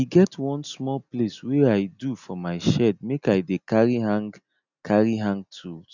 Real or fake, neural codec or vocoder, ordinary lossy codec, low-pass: real; none; none; 7.2 kHz